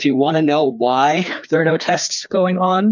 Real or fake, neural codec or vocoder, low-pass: fake; codec, 16 kHz, 2 kbps, FreqCodec, larger model; 7.2 kHz